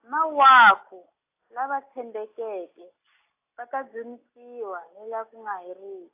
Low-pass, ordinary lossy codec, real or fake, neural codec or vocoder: 3.6 kHz; AAC, 24 kbps; real; none